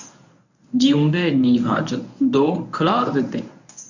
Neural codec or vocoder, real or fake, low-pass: codec, 24 kHz, 0.9 kbps, WavTokenizer, medium speech release version 1; fake; 7.2 kHz